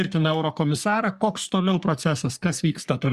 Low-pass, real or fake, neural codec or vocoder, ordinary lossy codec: 14.4 kHz; fake; codec, 44.1 kHz, 3.4 kbps, Pupu-Codec; Opus, 64 kbps